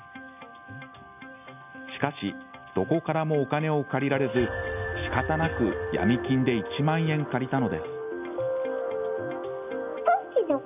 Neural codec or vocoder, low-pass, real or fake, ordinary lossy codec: none; 3.6 kHz; real; none